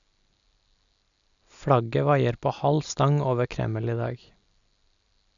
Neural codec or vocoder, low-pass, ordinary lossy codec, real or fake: none; 7.2 kHz; none; real